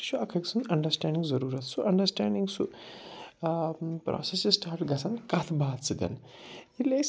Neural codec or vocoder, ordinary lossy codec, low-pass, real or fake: none; none; none; real